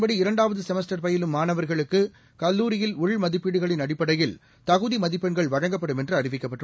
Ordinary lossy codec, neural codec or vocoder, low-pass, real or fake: none; none; none; real